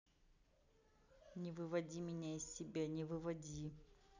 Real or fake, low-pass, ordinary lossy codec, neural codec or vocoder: real; 7.2 kHz; none; none